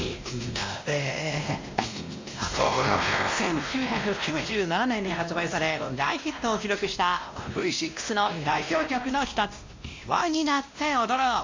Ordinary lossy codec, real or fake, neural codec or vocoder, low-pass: MP3, 48 kbps; fake; codec, 16 kHz, 1 kbps, X-Codec, WavLM features, trained on Multilingual LibriSpeech; 7.2 kHz